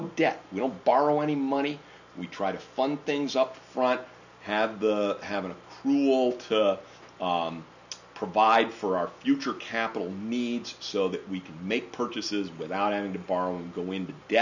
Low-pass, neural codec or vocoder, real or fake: 7.2 kHz; none; real